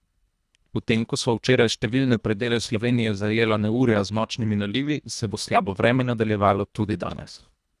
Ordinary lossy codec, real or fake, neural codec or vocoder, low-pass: none; fake; codec, 24 kHz, 1.5 kbps, HILCodec; none